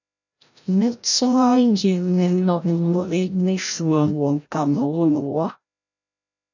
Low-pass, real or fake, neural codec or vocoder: 7.2 kHz; fake; codec, 16 kHz, 0.5 kbps, FreqCodec, larger model